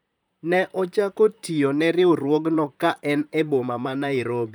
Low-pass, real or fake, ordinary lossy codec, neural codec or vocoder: none; fake; none; vocoder, 44.1 kHz, 128 mel bands, Pupu-Vocoder